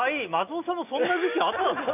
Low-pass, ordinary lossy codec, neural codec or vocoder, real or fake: 3.6 kHz; none; none; real